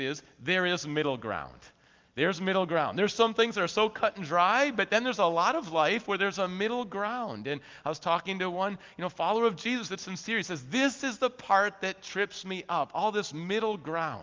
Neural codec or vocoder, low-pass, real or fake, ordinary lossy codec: none; 7.2 kHz; real; Opus, 32 kbps